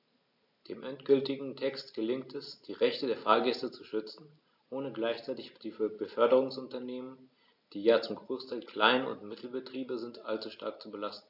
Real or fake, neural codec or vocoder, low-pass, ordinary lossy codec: real; none; 5.4 kHz; AAC, 48 kbps